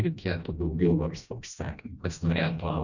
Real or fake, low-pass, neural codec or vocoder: fake; 7.2 kHz; codec, 16 kHz, 1 kbps, FreqCodec, smaller model